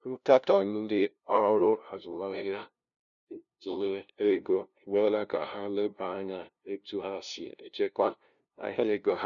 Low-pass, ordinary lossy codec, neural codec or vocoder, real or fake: 7.2 kHz; none; codec, 16 kHz, 0.5 kbps, FunCodec, trained on LibriTTS, 25 frames a second; fake